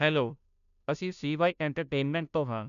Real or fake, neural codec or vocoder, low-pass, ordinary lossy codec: fake; codec, 16 kHz, 0.5 kbps, FunCodec, trained on Chinese and English, 25 frames a second; 7.2 kHz; none